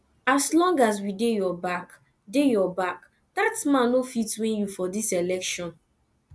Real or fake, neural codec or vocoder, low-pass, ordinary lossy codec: real; none; none; none